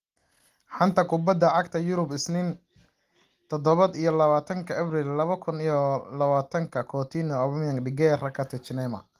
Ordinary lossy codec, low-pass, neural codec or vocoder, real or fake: Opus, 24 kbps; 14.4 kHz; none; real